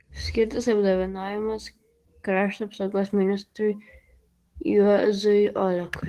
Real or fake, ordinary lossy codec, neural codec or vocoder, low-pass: fake; Opus, 16 kbps; codec, 44.1 kHz, 7.8 kbps, DAC; 14.4 kHz